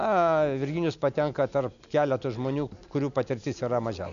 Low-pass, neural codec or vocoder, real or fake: 7.2 kHz; none; real